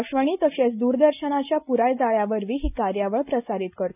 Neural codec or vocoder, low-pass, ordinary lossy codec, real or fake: none; 3.6 kHz; none; real